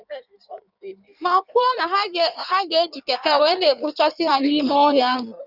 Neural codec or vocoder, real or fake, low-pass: codec, 16 kHz in and 24 kHz out, 1.1 kbps, FireRedTTS-2 codec; fake; 5.4 kHz